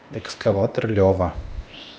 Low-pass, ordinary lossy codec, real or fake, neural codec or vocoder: none; none; fake; codec, 16 kHz, 0.8 kbps, ZipCodec